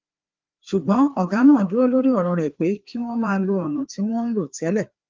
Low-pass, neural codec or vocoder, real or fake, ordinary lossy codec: 7.2 kHz; codec, 16 kHz, 2 kbps, FreqCodec, larger model; fake; Opus, 32 kbps